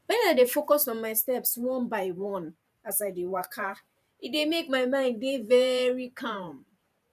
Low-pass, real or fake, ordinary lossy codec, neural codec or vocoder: 14.4 kHz; fake; none; vocoder, 44.1 kHz, 128 mel bands every 512 samples, BigVGAN v2